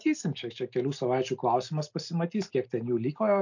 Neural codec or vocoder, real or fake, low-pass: none; real; 7.2 kHz